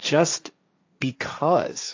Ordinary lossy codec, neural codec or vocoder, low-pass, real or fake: AAC, 48 kbps; codec, 16 kHz, 1.1 kbps, Voila-Tokenizer; 7.2 kHz; fake